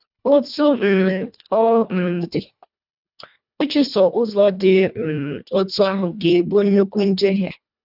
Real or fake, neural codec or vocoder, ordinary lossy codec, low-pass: fake; codec, 24 kHz, 1.5 kbps, HILCodec; none; 5.4 kHz